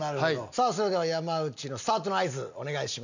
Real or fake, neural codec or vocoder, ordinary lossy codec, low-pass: real; none; none; 7.2 kHz